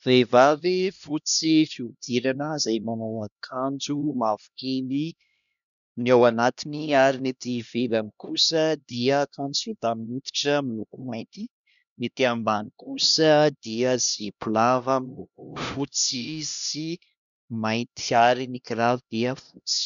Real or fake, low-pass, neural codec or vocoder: fake; 7.2 kHz; codec, 16 kHz, 1 kbps, X-Codec, HuBERT features, trained on LibriSpeech